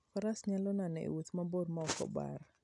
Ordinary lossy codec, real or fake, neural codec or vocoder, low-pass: none; real; none; 10.8 kHz